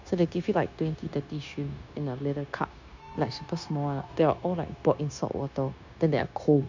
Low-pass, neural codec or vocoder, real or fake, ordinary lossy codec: 7.2 kHz; codec, 16 kHz, 0.9 kbps, LongCat-Audio-Codec; fake; none